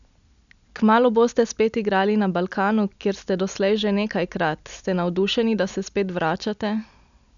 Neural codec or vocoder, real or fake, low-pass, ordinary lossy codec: none; real; 7.2 kHz; none